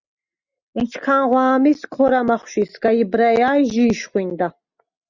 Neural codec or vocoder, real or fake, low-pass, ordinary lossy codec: none; real; 7.2 kHz; Opus, 64 kbps